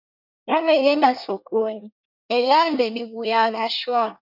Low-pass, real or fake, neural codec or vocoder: 5.4 kHz; fake; codec, 24 kHz, 1 kbps, SNAC